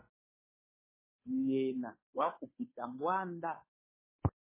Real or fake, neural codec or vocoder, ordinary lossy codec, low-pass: fake; codec, 16 kHz, 2 kbps, FunCodec, trained on Chinese and English, 25 frames a second; MP3, 16 kbps; 3.6 kHz